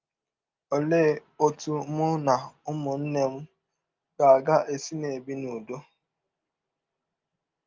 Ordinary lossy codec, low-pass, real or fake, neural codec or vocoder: Opus, 24 kbps; 7.2 kHz; real; none